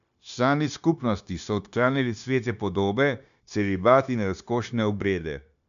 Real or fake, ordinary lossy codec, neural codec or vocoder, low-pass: fake; none; codec, 16 kHz, 0.9 kbps, LongCat-Audio-Codec; 7.2 kHz